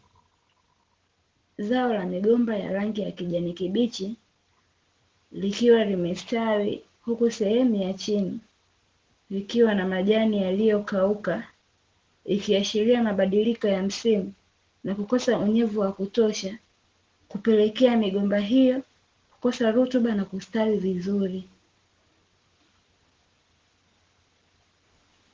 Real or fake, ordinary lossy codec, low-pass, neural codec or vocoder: real; Opus, 16 kbps; 7.2 kHz; none